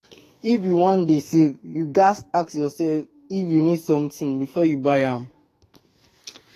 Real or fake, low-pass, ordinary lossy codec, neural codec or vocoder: fake; 14.4 kHz; AAC, 48 kbps; codec, 44.1 kHz, 2.6 kbps, SNAC